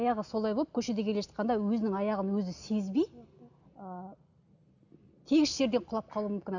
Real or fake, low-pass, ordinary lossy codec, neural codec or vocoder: real; 7.2 kHz; none; none